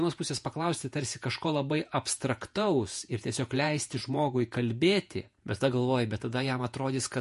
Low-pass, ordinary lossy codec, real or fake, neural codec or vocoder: 14.4 kHz; MP3, 48 kbps; fake; vocoder, 48 kHz, 128 mel bands, Vocos